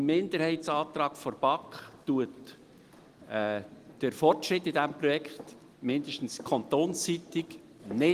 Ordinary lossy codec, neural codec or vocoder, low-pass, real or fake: Opus, 24 kbps; none; 14.4 kHz; real